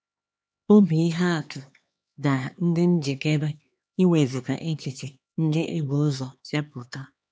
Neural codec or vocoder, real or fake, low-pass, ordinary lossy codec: codec, 16 kHz, 2 kbps, X-Codec, HuBERT features, trained on LibriSpeech; fake; none; none